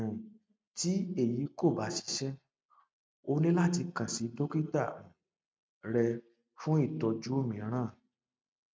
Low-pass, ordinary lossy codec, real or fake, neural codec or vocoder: none; none; real; none